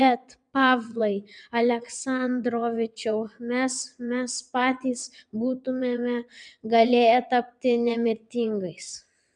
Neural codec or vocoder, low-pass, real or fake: vocoder, 22.05 kHz, 80 mel bands, WaveNeXt; 9.9 kHz; fake